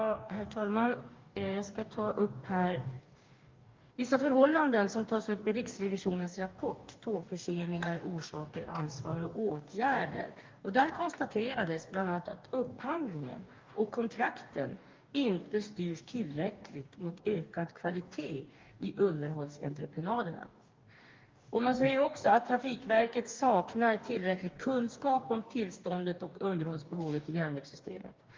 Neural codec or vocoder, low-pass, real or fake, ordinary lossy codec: codec, 44.1 kHz, 2.6 kbps, DAC; 7.2 kHz; fake; Opus, 32 kbps